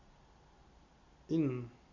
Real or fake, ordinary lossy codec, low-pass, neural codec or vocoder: real; MP3, 64 kbps; 7.2 kHz; none